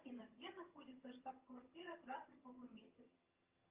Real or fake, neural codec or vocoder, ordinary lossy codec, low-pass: fake; vocoder, 22.05 kHz, 80 mel bands, HiFi-GAN; Opus, 24 kbps; 3.6 kHz